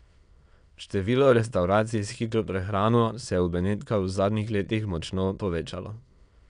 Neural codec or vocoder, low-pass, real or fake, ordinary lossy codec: autoencoder, 22.05 kHz, a latent of 192 numbers a frame, VITS, trained on many speakers; 9.9 kHz; fake; none